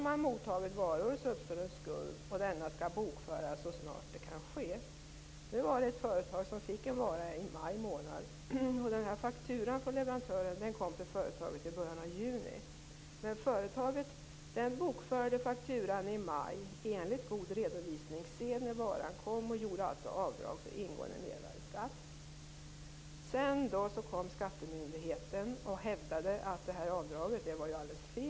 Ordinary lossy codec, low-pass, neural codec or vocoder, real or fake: none; none; none; real